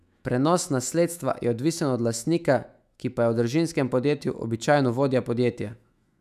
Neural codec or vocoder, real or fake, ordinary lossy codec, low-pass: autoencoder, 48 kHz, 128 numbers a frame, DAC-VAE, trained on Japanese speech; fake; none; 14.4 kHz